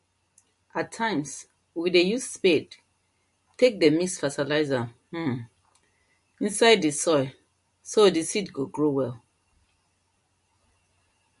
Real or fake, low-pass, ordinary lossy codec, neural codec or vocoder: real; 14.4 kHz; MP3, 48 kbps; none